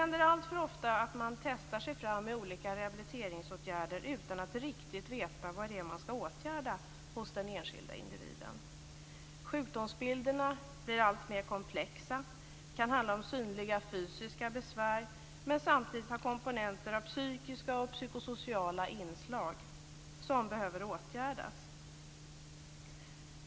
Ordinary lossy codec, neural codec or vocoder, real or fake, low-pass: none; none; real; none